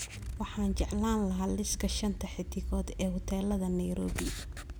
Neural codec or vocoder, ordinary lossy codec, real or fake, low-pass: none; none; real; none